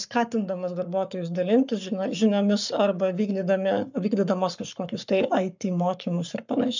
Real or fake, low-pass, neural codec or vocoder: fake; 7.2 kHz; codec, 44.1 kHz, 7.8 kbps, Pupu-Codec